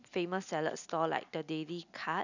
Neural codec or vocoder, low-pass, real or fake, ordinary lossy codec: none; 7.2 kHz; real; none